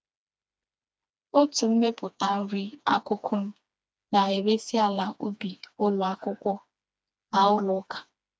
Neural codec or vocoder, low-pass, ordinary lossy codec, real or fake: codec, 16 kHz, 2 kbps, FreqCodec, smaller model; none; none; fake